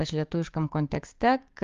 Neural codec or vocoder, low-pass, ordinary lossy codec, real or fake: codec, 16 kHz, 6 kbps, DAC; 7.2 kHz; Opus, 32 kbps; fake